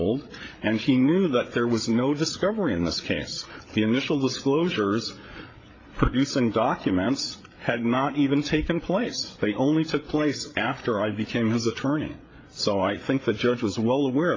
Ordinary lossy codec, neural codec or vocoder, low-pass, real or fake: AAC, 32 kbps; codec, 16 kHz, 8 kbps, FreqCodec, larger model; 7.2 kHz; fake